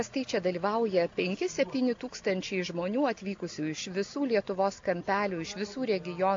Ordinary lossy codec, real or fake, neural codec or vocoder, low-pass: AAC, 48 kbps; real; none; 7.2 kHz